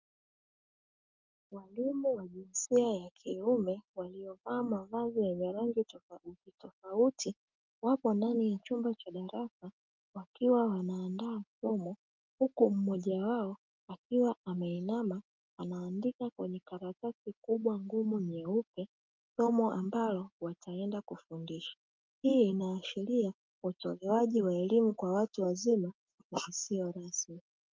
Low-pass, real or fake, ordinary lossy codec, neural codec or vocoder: 7.2 kHz; real; Opus, 32 kbps; none